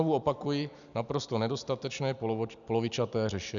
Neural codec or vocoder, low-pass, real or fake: none; 7.2 kHz; real